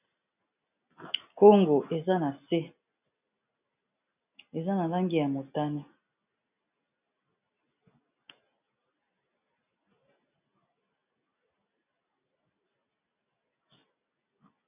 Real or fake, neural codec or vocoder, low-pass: real; none; 3.6 kHz